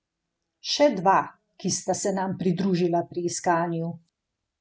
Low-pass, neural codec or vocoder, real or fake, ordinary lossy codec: none; none; real; none